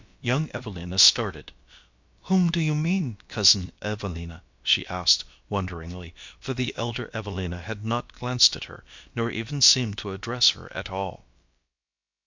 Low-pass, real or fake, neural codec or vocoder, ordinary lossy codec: 7.2 kHz; fake; codec, 16 kHz, about 1 kbps, DyCAST, with the encoder's durations; MP3, 64 kbps